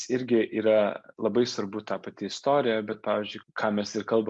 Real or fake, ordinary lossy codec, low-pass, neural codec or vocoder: real; MP3, 64 kbps; 10.8 kHz; none